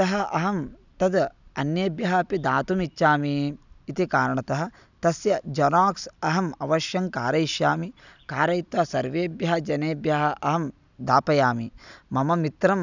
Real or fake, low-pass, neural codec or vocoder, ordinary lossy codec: real; 7.2 kHz; none; none